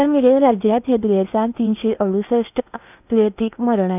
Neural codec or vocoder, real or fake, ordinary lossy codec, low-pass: codec, 16 kHz in and 24 kHz out, 0.8 kbps, FocalCodec, streaming, 65536 codes; fake; none; 3.6 kHz